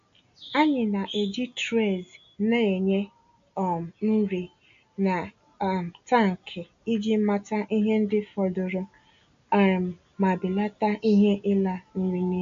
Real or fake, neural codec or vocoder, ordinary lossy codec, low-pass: real; none; AAC, 64 kbps; 7.2 kHz